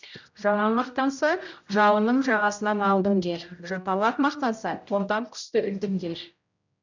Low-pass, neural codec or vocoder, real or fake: 7.2 kHz; codec, 16 kHz, 0.5 kbps, X-Codec, HuBERT features, trained on general audio; fake